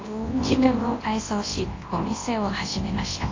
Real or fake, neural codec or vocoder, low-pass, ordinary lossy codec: fake; codec, 24 kHz, 0.9 kbps, WavTokenizer, large speech release; 7.2 kHz; AAC, 32 kbps